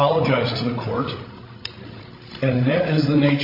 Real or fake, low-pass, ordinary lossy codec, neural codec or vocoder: fake; 5.4 kHz; AAC, 32 kbps; codec, 16 kHz, 16 kbps, FreqCodec, larger model